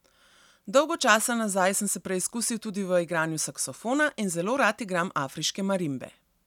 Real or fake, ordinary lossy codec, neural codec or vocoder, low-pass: real; none; none; 19.8 kHz